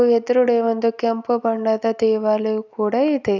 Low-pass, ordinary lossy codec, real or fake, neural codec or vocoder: 7.2 kHz; none; real; none